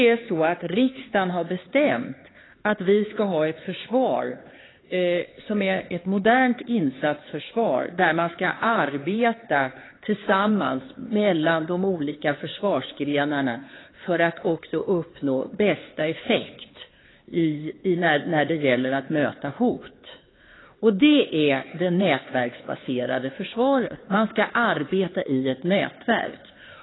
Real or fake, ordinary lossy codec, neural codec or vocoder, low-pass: fake; AAC, 16 kbps; codec, 16 kHz, 4 kbps, X-Codec, HuBERT features, trained on LibriSpeech; 7.2 kHz